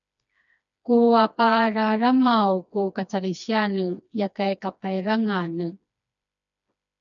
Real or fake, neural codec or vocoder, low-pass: fake; codec, 16 kHz, 2 kbps, FreqCodec, smaller model; 7.2 kHz